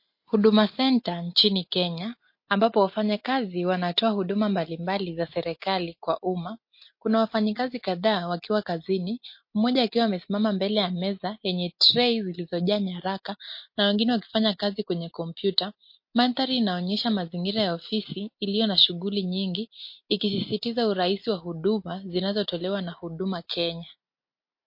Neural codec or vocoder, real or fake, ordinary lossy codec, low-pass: none; real; MP3, 32 kbps; 5.4 kHz